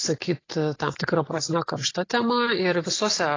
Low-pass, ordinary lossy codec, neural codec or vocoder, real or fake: 7.2 kHz; AAC, 32 kbps; none; real